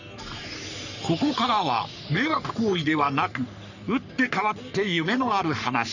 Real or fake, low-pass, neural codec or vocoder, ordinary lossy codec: fake; 7.2 kHz; codec, 44.1 kHz, 3.4 kbps, Pupu-Codec; none